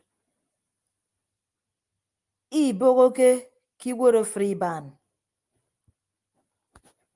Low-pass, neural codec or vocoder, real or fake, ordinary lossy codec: 10.8 kHz; none; real; Opus, 32 kbps